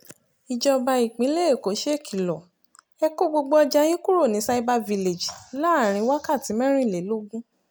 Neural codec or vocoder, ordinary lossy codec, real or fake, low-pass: none; none; real; none